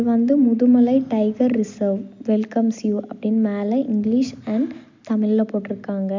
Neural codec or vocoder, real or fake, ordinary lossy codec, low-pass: none; real; MP3, 64 kbps; 7.2 kHz